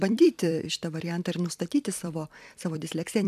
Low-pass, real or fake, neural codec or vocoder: 14.4 kHz; fake; vocoder, 44.1 kHz, 128 mel bands every 256 samples, BigVGAN v2